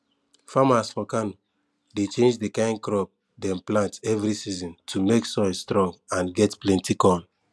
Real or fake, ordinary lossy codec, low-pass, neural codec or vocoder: real; none; none; none